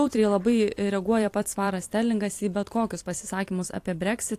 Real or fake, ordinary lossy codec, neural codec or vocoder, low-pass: fake; AAC, 64 kbps; vocoder, 44.1 kHz, 128 mel bands every 256 samples, BigVGAN v2; 14.4 kHz